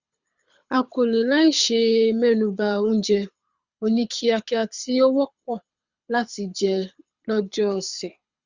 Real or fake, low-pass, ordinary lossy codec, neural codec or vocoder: fake; 7.2 kHz; Opus, 64 kbps; codec, 24 kHz, 6 kbps, HILCodec